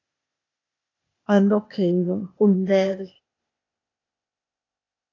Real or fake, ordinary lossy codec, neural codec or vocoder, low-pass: fake; AAC, 32 kbps; codec, 16 kHz, 0.8 kbps, ZipCodec; 7.2 kHz